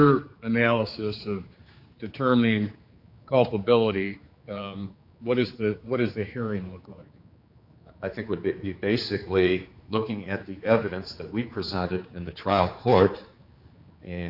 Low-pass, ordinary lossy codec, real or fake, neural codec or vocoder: 5.4 kHz; Opus, 64 kbps; fake; codec, 16 kHz, 4 kbps, X-Codec, HuBERT features, trained on general audio